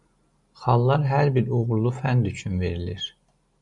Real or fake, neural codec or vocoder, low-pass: real; none; 10.8 kHz